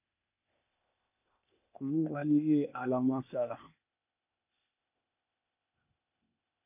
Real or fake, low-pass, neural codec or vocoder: fake; 3.6 kHz; codec, 16 kHz, 0.8 kbps, ZipCodec